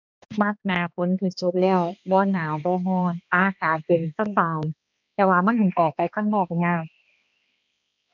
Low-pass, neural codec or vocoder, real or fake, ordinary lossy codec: 7.2 kHz; codec, 16 kHz, 2 kbps, X-Codec, HuBERT features, trained on balanced general audio; fake; none